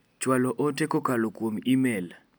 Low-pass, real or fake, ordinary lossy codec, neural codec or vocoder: none; real; none; none